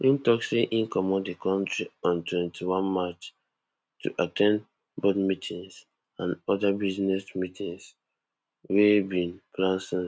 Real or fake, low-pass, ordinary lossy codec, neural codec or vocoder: real; none; none; none